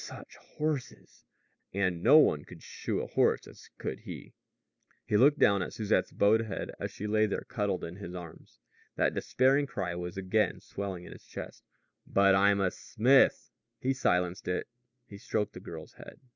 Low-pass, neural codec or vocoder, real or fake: 7.2 kHz; none; real